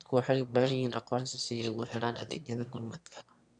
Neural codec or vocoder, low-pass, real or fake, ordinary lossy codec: autoencoder, 22.05 kHz, a latent of 192 numbers a frame, VITS, trained on one speaker; 9.9 kHz; fake; none